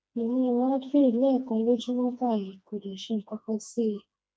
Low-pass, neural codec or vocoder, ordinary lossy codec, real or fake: none; codec, 16 kHz, 2 kbps, FreqCodec, smaller model; none; fake